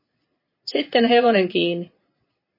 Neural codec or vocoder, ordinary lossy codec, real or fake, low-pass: vocoder, 22.05 kHz, 80 mel bands, Vocos; MP3, 24 kbps; fake; 5.4 kHz